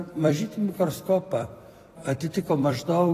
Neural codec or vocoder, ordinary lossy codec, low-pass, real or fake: vocoder, 44.1 kHz, 128 mel bands every 256 samples, BigVGAN v2; AAC, 48 kbps; 14.4 kHz; fake